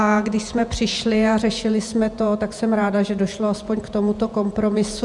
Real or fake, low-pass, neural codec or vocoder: fake; 10.8 kHz; vocoder, 48 kHz, 128 mel bands, Vocos